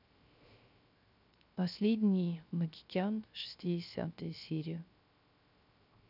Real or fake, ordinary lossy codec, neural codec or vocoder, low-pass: fake; none; codec, 16 kHz, 0.3 kbps, FocalCodec; 5.4 kHz